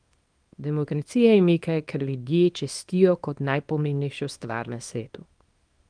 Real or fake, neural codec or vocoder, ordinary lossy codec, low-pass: fake; codec, 24 kHz, 0.9 kbps, WavTokenizer, small release; Opus, 32 kbps; 9.9 kHz